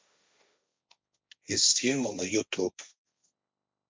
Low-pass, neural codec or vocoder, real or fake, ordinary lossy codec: none; codec, 16 kHz, 1.1 kbps, Voila-Tokenizer; fake; none